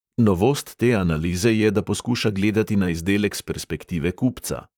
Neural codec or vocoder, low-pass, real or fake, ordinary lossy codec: vocoder, 44.1 kHz, 128 mel bands, Pupu-Vocoder; none; fake; none